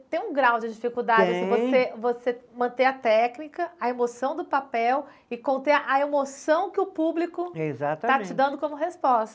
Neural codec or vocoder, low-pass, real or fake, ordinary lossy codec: none; none; real; none